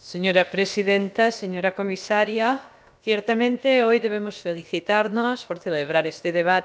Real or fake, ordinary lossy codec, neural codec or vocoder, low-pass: fake; none; codec, 16 kHz, about 1 kbps, DyCAST, with the encoder's durations; none